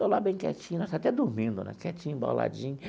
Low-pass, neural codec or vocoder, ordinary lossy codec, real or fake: none; none; none; real